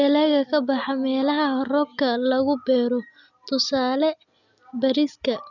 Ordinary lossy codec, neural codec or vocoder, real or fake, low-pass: none; none; real; 7.2 kHz